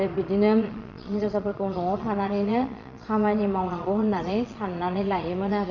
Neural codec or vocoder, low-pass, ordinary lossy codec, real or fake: vocoder, 22.05 kHz, 80 mel bands, Vocos; 7.2 kHz; none; fake